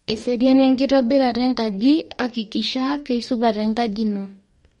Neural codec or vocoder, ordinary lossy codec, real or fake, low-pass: codec, 44.1 kHz, 2.6 kbps, DAC; MP3, 48 kbps; fake; 19.8 kHz